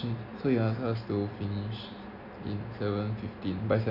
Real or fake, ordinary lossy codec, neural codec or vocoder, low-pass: real; none; none; 5.4 kHz